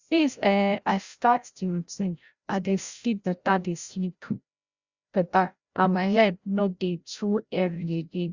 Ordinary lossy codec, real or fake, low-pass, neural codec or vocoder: Opus, 64 kbps; fake; 7.2 kHz; codec, 16 kHz, 0.5 kbps, FreqCodec, larger model